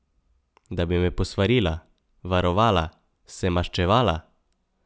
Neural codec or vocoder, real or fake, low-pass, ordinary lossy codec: none; real; none; none